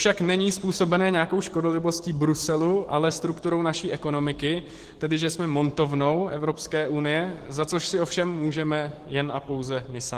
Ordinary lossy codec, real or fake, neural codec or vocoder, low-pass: Opus, 16 kbps; fake; codec, 44.1 kHz, 7.8 kbps, DAC; 14.4 kHz